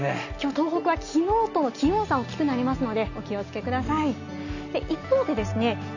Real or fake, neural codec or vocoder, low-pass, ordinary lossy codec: real; none; 7.2 kHz; none